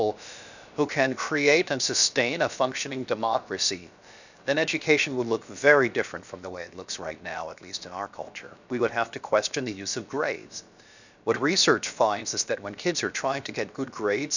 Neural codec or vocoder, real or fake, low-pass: codec, 16 kHz, about 1 kbps, DyCAST, with the encoder's durations; fake; 7.2 kHz